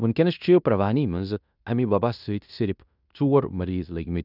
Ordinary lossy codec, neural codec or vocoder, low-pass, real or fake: none; codec, 16 kHz in and 24 kHz out, 0.9 kbps, LongCat-Audio-Codec, fine tuned four codebook decoder; 5.4 kHz; fake